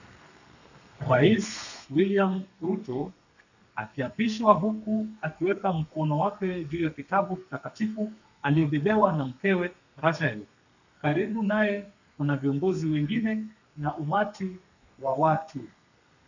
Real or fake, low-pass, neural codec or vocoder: fake; 7.2 kHz; codec, 32 kHz, 1.9 kbps, SNAC